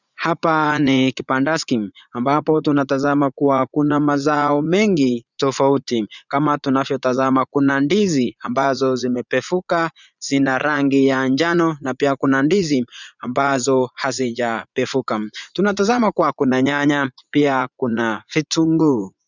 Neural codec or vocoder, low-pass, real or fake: vocoder, 44.1 kHz, 80 mel bands, Vocos; 7.2 kHz; fake